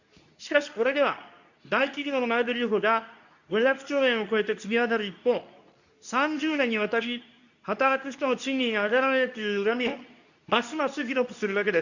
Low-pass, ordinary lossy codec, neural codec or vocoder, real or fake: 7.2 kHz; none; codec, 24 kHz, 0.9 kbps, WavTokenizer, medium speech release version 2; fake